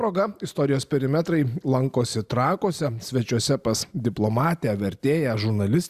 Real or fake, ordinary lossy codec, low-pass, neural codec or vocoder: real; Opus, 64 kbps; 14.4 kHz; none